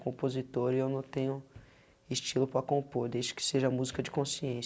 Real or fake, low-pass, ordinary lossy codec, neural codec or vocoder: real; none; none; none